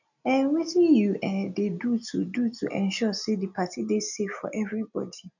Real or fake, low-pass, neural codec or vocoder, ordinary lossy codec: real; 7.2 kHz; none; none